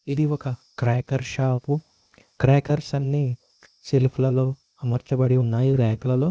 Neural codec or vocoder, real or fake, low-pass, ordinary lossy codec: codec, 16 kHz, 0.8 kbps, ZipCodec; fake; none; none